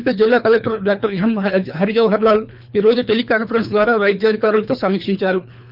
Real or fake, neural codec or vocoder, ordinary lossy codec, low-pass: fake; codec, 24 kHz, 3 kbps, HILCodec; none; 5.4 kHz